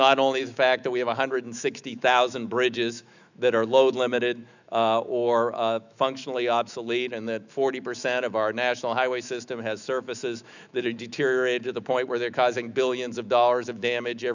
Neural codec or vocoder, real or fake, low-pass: autoencoder, 48 kHz, 128 numbers a frame, DAC-VAE, trained on Japanese speech; fake; 7.2 kHz